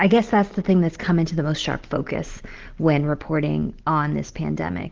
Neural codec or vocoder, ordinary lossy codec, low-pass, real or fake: none; Opus, 16 kbps; 7.2 kHz; real